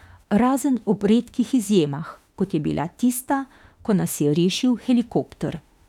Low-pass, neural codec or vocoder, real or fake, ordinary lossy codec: 19.8 kHz; autoencoder, 48 kHz, 32 numbers a frame, DAC-VAE, trained on Japanese speech; fake; none